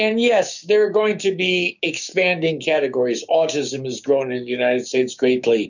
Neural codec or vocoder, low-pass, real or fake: codec, 16 kHz, 6 kbps, DAC; 7.2 kHz; fake